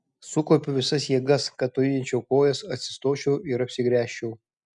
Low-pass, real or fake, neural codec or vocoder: 10.8 kHz; real; none